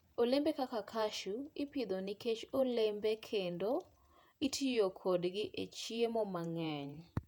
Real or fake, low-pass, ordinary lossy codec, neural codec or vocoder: fake; 19.8 kHz; none; vocoder, 48 kHz, 128 mel bands, Vocos